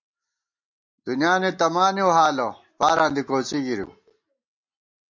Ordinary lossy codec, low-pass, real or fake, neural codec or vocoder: MP3, 48 kbps; 7.2 kHz; real; none